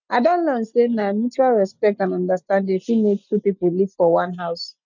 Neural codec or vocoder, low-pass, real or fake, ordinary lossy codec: none; 7.2 kHz; real; none